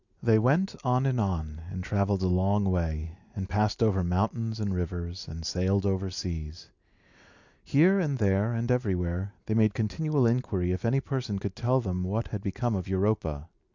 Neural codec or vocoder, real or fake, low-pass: none; real; 7.2 kHz